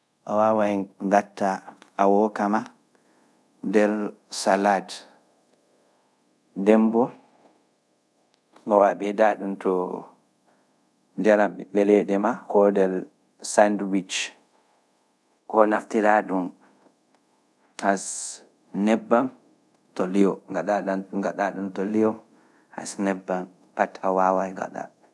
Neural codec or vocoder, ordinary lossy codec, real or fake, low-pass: codec, 24 kHz, 0.5 kbps, DualCodec; none; fake; none